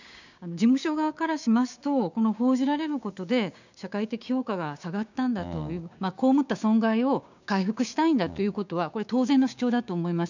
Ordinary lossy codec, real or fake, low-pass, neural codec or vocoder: none; fake; 7.2 kHz; codec, 16 kHz, 6 kbps, DAC